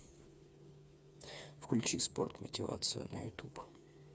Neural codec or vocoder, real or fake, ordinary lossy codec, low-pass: codec, 16 kHz, 4 kbps, FreqCodec, smaller model; fake; none; none